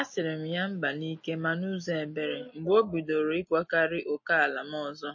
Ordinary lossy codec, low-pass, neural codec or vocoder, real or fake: MP3, 32 kbps; 7.2 kHz; none; real